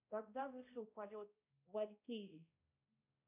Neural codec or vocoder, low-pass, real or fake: codec, 16 kHz, 0.5 kbps, X-Codec, HuBERT features, trained on balanced general audio; 3.6 kHz; fake